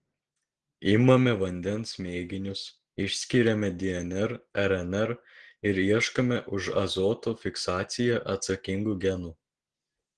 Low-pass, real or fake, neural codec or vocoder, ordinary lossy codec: 9.9 kHz; real; none; Opus, 16 kbps